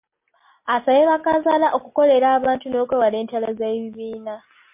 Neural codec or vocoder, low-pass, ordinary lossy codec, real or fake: none; 3.6 kHz; MP3, 24 kbps; real